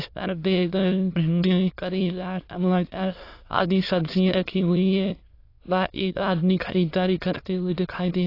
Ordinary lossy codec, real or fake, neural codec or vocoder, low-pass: AAC, 32 kbps; fake; autoencoder, 22.05 kHz, a latent of 192 numbers a frame, VITS, trained on many speakers; 5.4 kHz